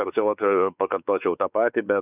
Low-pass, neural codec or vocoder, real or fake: 3.6 kHz; codec, 16 kHz, 4 kbps, X-Codec, WavLM features, trained on Multilingual LibriSpeech; fake